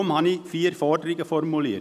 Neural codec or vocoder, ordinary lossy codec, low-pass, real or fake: none; none; 14.4 kHz; real